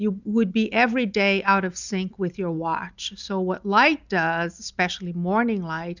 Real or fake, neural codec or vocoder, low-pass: real; none; 7.2 kHz